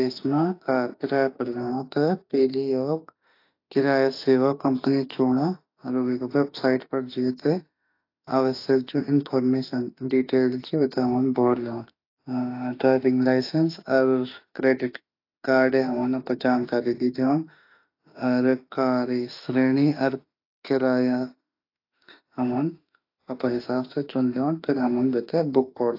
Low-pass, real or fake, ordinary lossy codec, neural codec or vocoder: 5.4 kHz; fake; AAC, 32 kbps; autoencoder, 48 kHz, 32 numbers a frame, DAC-VAE, trained on Japanese speech